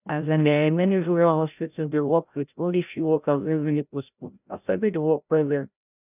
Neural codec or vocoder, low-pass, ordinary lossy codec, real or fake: codec, 16 kHz, 0.5 kbps, FreqCodec, larger model; 3.6 kHz; none; fake